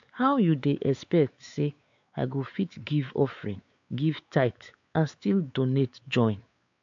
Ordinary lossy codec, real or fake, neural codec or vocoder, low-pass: AAC, 64 kbps; fake; codec, 16 kHz, 4 kbps, X-Codec, WavLM features, trained on Multilingual LibriSpeech; 7.2 kHz